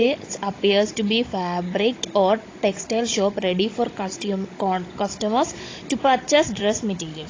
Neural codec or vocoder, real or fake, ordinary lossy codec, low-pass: codec, 16 kHz, 16 kbps, FunCodec, trained on Chinese and English, 50 frames a second; fake; AAC, 32 kbps; 7.2 kHz